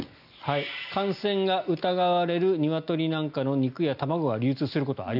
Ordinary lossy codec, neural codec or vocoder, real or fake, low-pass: none; none; real; 5.4 kHz